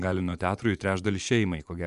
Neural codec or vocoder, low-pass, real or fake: vocoder, 24 kHz, 100 mel bands, Vocos; 10.8 kHz; fake